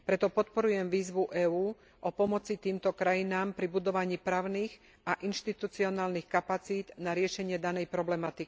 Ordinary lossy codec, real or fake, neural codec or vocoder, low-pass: none; real; none; none